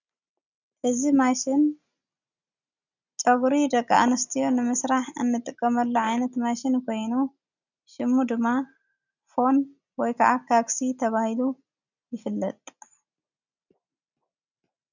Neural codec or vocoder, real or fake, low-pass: none; real; 7.2 kHz